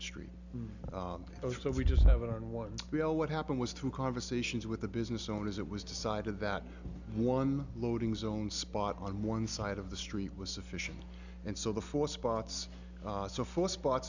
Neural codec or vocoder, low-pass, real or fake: none; 7.2 kHz; real